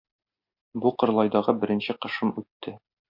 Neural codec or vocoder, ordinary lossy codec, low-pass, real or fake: none; MP3, 48 kbps; 5.4 kHz; real